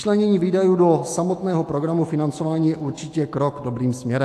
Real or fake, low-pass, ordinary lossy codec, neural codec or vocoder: fake; 14.4 kHz; AAC, 64 kbps; autoencoder, 48 kHz, 128 numbers a frame, DAC-VAE, trained on Japanese speech